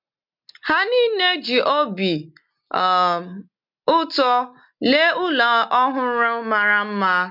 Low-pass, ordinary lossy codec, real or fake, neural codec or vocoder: 5.4 kHz; MP3, 48 kbps; real; none